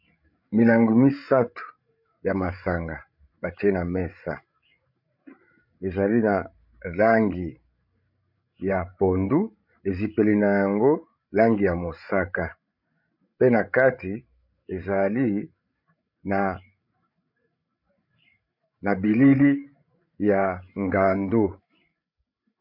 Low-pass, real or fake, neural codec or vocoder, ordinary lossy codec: 5.4 kHz; fake; codec, 16 kHz, 16 kbps, FreqCodec, larger model; MP3, 48 kbps